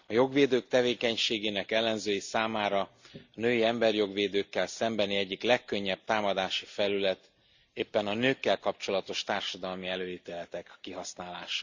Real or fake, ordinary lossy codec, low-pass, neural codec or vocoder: real; Opus, 64 kbps; 7.2 kHz; none